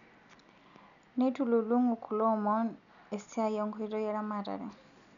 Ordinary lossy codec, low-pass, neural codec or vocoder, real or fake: none; 7.2 kHz; none; real